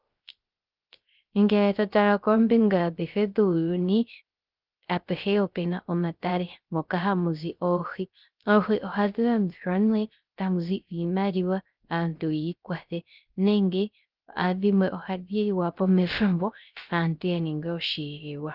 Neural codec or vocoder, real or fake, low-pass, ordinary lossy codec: codec, 16 kHz, 0.3 kbps, FocalCodec; fake; 5.4 kHz; Opus, 32 kbps